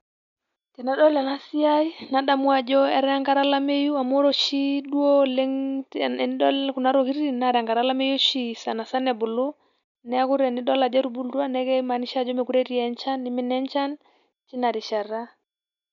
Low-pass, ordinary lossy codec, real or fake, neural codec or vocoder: 7.2 kHz; none; real; none